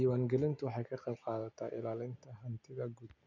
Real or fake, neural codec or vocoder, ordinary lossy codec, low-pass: real; none; none; 7.2 kHz